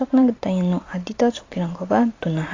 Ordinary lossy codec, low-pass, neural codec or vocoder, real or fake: AAC, 32 kbps; 7.2 kHz; vocoder, 44.1 kHz, 128 mel bands every 256 samples, BigVGAN v2; fake